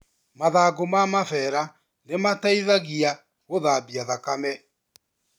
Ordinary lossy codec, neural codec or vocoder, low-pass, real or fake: none; none; none; real